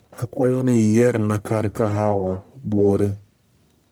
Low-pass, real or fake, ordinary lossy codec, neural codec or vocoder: none; fake; none; codec, 44.1 kHz, 1.7 kbps, Pupu-Codec